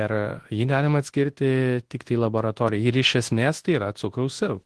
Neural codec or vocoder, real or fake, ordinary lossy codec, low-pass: codec, 24 kHz, 0.9 kbps, WavTokenizer, large speech release; fake; Opus, 16 kbps; 10.8 kHz